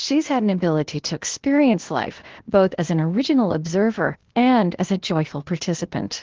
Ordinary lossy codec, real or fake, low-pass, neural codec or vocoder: Opus, 16 kbps; fake; 7.2 kHz; codec, 16 kHz, 0.8 kbps, ZipCodec